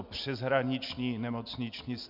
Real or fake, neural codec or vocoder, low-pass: real; none; 5.4 kHz